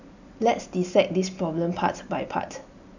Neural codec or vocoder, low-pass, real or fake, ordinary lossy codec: none; 7.2 kHz; real; none